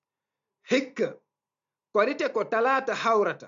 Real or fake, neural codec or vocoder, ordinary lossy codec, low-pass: real; none; MP3, 64 kbps; 7.2 kHz